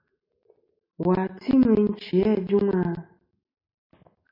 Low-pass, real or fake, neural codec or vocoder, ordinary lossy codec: 5.4 kHz; real; none; MP3, 32 kbps